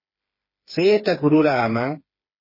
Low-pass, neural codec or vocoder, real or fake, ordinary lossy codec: 5.4 kHz; codec, 16 kHz, 4 kbps, FreqCodec, smaller model; fake; MP3, 24 kbps